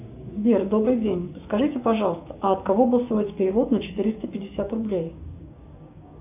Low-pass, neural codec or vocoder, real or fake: 3.6 kHz; codec, 16 kHz, 6 kbps, DAC; fake